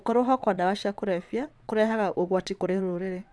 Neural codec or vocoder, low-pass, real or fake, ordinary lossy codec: vocoder, 22.05 kHz, 80 mel bands, WaveNeXt; none; fake; none